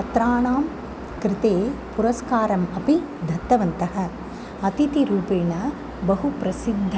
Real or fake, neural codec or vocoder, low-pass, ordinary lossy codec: real; none; none; none